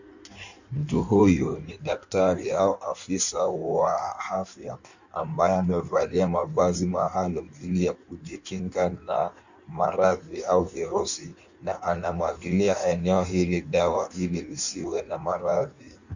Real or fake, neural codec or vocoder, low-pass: fake; codec, 16 kHz in and 24 kHz out, 1.1 kbps, FireRedTTS-2 codec; 7.2 kHz